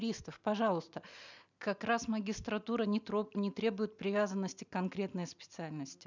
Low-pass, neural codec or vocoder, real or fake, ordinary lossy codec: 7.2 kHz; none; real; none